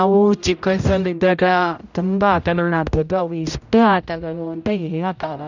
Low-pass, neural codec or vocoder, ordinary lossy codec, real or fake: 7.2 kHz; codec, 16 kHz, 0.5 kbps, X-Codec, HuBERT features, trained on general audio; none; fake